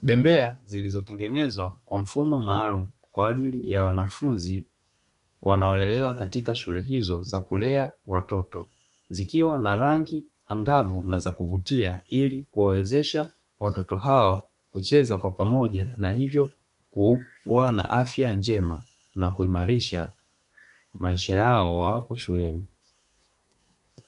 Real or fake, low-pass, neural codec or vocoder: fake; 10.8 kHz; codec, 24 kHz, 1 kbps, SNAC